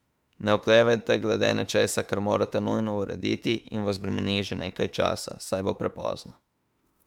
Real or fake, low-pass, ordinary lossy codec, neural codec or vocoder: fake; 19.8 kHz; MP3, 96 kbps; autoencoder, 48 kHz, 32 numbers a frame, DAC-VAE, trained on Japanese speech